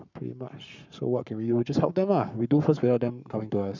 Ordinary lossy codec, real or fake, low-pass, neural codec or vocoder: none; fake; 7.2 kHz; codec, 44.1 kHz, 7.8 kbps, Pupu-Codec